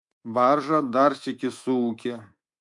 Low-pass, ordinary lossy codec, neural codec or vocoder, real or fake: 10.8 kHz; MP3, 64 kbps; codec, 24 kHz, 3.1 kbps, DualCodec; fake